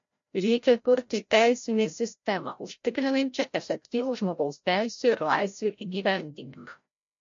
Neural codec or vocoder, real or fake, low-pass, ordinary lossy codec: codec, 16 kHz, 0.5 kbps, FreqCodec, larger model; fake; 7.2 kHz; MP3, 48 kbps